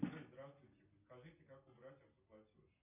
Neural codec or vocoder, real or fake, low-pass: none; real; 3.6 kHz